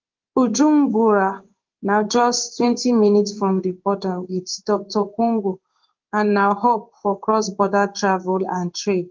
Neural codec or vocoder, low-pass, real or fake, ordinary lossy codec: codec, 16 kHz in and 24 kHz out, 1 kbps, XY-Tokenizer; 7.2 kHz; fake; Opus, 24 kbps